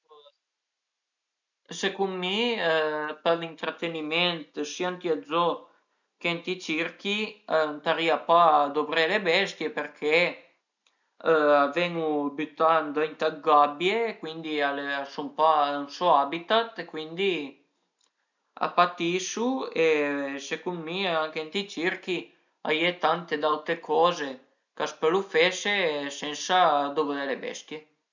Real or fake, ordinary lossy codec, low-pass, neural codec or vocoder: real; none; 7.2 kHz; none